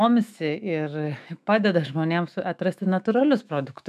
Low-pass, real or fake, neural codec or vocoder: 14.4 kHz; fake; autoencoder, 48 kHz, 128 numbers a frame, DAC-VAE, trained on Japanese speech